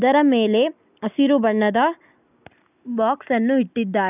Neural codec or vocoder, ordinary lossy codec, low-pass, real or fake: none; none; 3.6 kHz; real